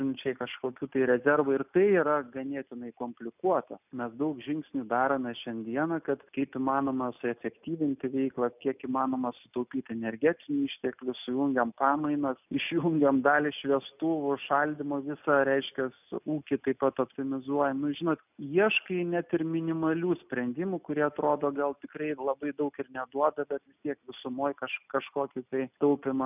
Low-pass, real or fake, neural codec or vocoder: 3.6 kHz; real; none